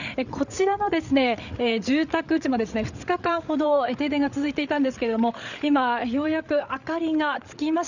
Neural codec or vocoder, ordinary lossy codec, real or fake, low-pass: codec, 16 kHz, 8 kbps, FreqCodec, larger model; none; fake; 7.2 kHz